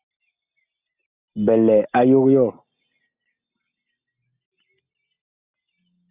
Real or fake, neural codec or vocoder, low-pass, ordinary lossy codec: real; none; 3.6 kHz; Opus, 64 kbps